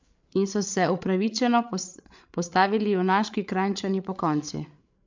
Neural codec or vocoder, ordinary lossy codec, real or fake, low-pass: codec, 16 kHz, 8 kbps, FreqCodec, larger model; MP3, 64 kbps; fake; 7.2 kHz